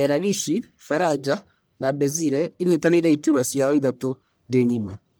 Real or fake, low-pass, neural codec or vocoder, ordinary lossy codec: fake; none; codec, 44.1 kHz, 1.7 kbps, Pupu-Codec; none